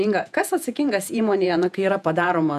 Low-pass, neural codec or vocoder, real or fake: 14.4 kHz; vocoder, 48 kHz, 128 mel bands, Vocos; fake